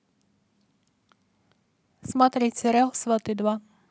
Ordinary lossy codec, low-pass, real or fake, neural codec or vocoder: none; none; real; none